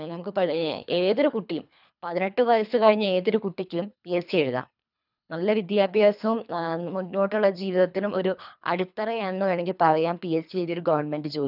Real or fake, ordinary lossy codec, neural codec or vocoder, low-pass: fake; none; codec, 24 kHz, 3 kbps, HILCodec; 5.4 kHz